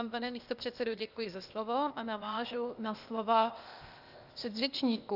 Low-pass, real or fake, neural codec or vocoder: 5.4 kHz; fake; codec, 16 kHz, 0.8 kbps, ZipCodec